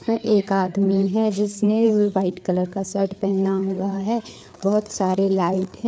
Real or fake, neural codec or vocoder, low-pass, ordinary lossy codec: fake; codec, 16 kHz, 4 kbps, FreqCodec, larger model; none; none